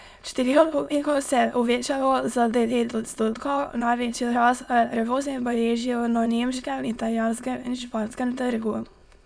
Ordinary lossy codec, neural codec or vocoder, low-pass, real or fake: none; autoencoder, 22.05 kHz, a latent of 192 numbers a frame, VITS, trained on many speakers; none; fake